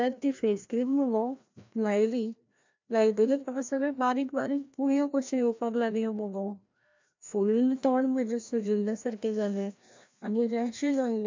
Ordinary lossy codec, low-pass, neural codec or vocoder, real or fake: MP3, 64 kbps; 7.2 kHz; codec, 16 kHz, 1 kbps, FreqCodec, larger model; fake